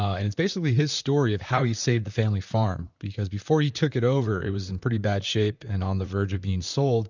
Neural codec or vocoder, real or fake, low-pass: vocoder, 44.1 kHz, 128 mel bands, Pupu-Vocoder; fake; 7.2 kHz